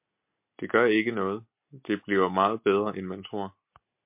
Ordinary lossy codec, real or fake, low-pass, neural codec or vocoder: MP3, 32 kbps; real; 3.6 kHz; none